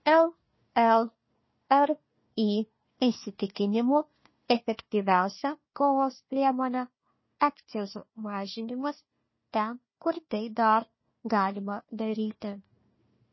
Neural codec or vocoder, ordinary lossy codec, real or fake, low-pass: codec, 16 kHz, 1 kbps, FunCodec, trained on Chinese and English, 50 frames a second; MP3, 24 kbps; fake; 7.2 kHz